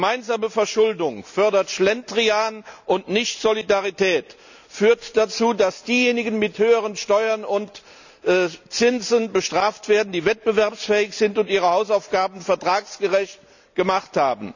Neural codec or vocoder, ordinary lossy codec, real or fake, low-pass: none; none; real; 7.2 kHz